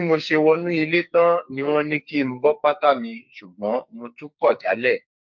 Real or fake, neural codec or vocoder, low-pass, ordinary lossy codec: fake; codec, 44.1 kHz, 2.6 kbps, SNAC; 7.2 kHz; MP3, 48 kbps